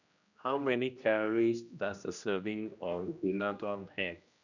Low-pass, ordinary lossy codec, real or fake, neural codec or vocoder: 7.2 kHz; none; fake; codec, 16 kHz, 1 kbps, X-Codec, HuBERT features, trained on general audio